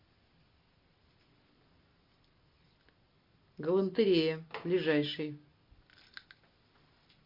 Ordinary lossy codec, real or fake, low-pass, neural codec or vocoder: MP3, 32 kbps; real; 5.4 kHz; none